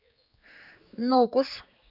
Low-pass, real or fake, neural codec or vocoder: 5.4 kHz; fake; codec, 16 kHz, 4 kbps, X-Codec, HuBERT features, trained on general audio